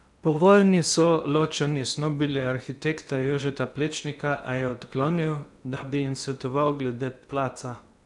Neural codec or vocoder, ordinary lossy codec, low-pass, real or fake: codec, 16 kHz in and 24 kHz out, 0.8 kbps, FocalCodec, streaming, 65536 codes; none; 10.8 kHz; fake